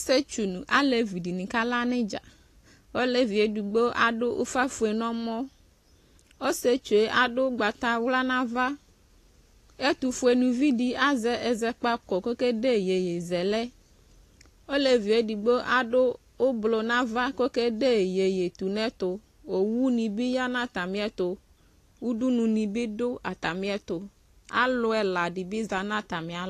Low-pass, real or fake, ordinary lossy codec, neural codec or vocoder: 14.4 kHz; real; AAC, 48 kbps; none